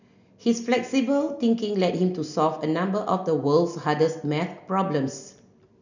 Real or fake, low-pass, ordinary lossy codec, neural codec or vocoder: real; 7.2 kHz; none; none